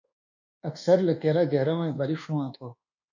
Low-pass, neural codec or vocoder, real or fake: 7.2 kHz; codec, 24 kHz, 1.2 kbps, DualCodec; fake